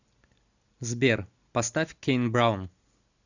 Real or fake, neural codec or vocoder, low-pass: real; none; 7.2 kHz